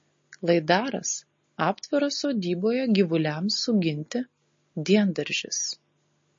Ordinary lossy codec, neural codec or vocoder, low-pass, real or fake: MP3, 32 kbps; none; 7.2 kHz; real